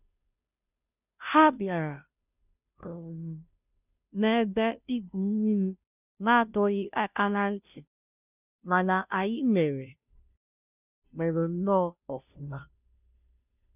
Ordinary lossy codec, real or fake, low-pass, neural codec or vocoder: none; fake; 3.6 kHz; codec, 16 kHz, 0.5 kbps, FunCodec, trained on Chinese and English, 25 frames a second